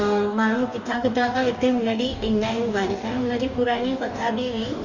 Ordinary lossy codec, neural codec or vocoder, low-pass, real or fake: none; codec, 44.1 kHz, 2.6 kbps, DAC; 7.2 kHz; fake